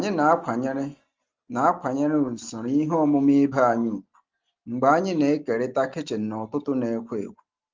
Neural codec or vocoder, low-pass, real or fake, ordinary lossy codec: none; 7.2 kHz; real; Opus, 32 kbps